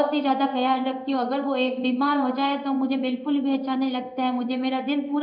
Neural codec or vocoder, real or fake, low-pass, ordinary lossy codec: codec, 16 kHz in and 24 kHz out, 1 kbps, XY-Tokenizer; fake; 5.4 kHz; none